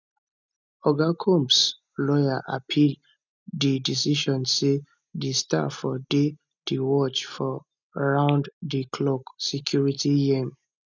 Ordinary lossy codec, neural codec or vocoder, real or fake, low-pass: none; none; real; 7.2 kHz